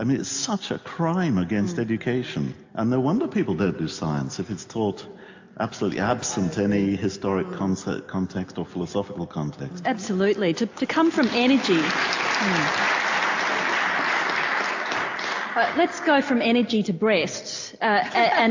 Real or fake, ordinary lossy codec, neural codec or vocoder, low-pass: real; AAC, 48 kbps; none; 7.2 kHz